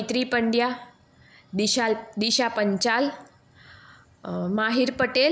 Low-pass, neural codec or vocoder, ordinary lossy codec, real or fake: none; none; none; real